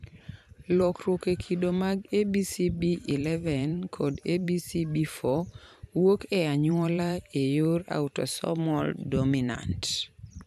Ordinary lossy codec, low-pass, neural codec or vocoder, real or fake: none; 14.4 kHz; vocoder, 44.1 kHz, 128 mel bands every 512 samples, BigVGAN v2; fake